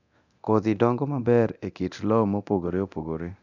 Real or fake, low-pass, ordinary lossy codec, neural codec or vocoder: fake; 7.2 kHz; none; codec, 24 kHz, 0.9 kbps, DualCodec